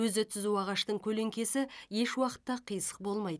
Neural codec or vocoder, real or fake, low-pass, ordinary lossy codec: none; real; none; none